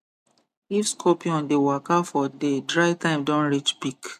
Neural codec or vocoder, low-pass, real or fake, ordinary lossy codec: none; 14.4 kHz; real; MP3, 96 kbps